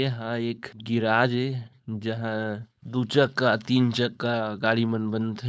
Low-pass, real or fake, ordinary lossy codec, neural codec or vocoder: none; fake; none; codec, 16 kHz, 4.8 kbps, FACodec